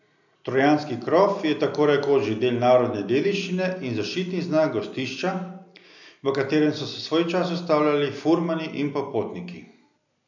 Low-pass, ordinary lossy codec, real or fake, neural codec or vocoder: 7.2 kHz; none; real; none